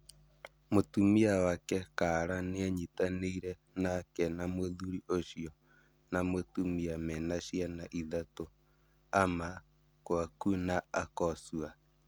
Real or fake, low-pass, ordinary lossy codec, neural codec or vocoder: real; none; none; none